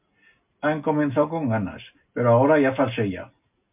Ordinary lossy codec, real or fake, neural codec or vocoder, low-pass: MP3, 32 kbps; real; none; 3.6 kHz